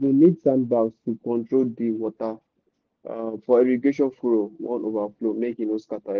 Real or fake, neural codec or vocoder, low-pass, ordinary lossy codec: real; none; 7.2 kHz; Opus, 16 kbps